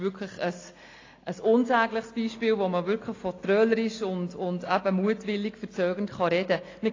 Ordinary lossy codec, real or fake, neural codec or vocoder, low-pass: AAC, 32 kbps; real; none; 7.2 kHz